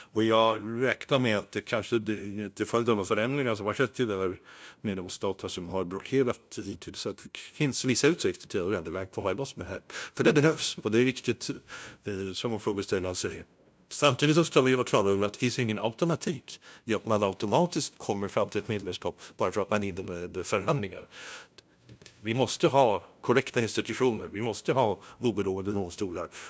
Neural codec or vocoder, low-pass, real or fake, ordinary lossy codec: codec, 16 kHz, 0.5 kbps, FunCodec, trained on LibriTTS, 25 frames a second; none; fake; none